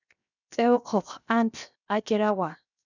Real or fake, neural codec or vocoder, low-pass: fake; codec, 16 kHz, 0.7 kbps, FocalCodec; 7.2 kHz